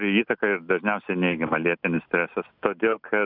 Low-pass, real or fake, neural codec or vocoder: 5.4 kHz; real; none